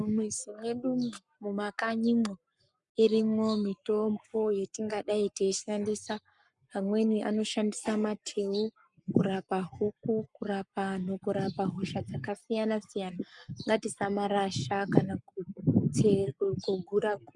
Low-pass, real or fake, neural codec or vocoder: 10.8 kHz; fake; codec, 44.1 kHz, 7.8 kbps, Pupu-Codec